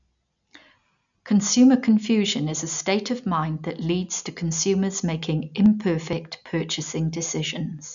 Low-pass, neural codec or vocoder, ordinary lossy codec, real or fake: 7.2 kHz; none; none; real